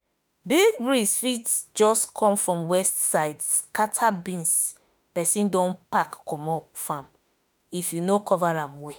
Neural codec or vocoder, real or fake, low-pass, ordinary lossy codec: autoencoder, 48 kHz, 32 numbers a frame, DAC-VAE, trained on Japanese speech; fake; none; none